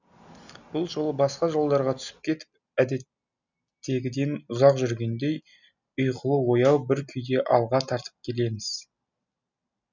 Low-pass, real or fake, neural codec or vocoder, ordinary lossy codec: 7.2 kHz; real; none; none